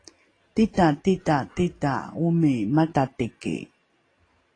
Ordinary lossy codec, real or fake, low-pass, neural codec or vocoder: AAC, 32 kbps; real; 9.9 kHz; none